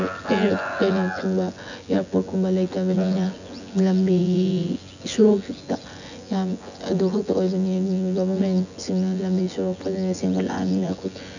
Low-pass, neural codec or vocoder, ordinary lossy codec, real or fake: 7.2 kHz; vocoder, 24 kHz, 100 mel bands, Vocos; none; fake